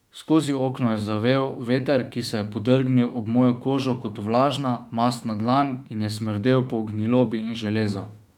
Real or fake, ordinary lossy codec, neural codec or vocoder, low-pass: fake; none; autoencoder, 48 kHz, 32 numbers a frame, DAC-VAE, trained on Japanese speech; 19.8 kHz